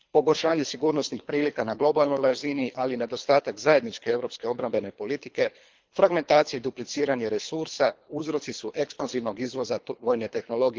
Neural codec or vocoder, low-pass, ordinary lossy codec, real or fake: codec, 24 kHz, 3 kbps, HILCodec; 7.2 kHz; Opus, 32 kbps; fake